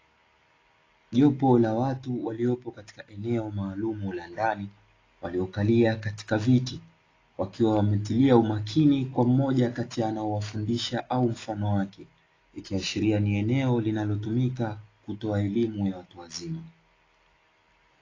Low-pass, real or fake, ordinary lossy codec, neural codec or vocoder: 7.2 kHz; real; AAC, 32 kbps; none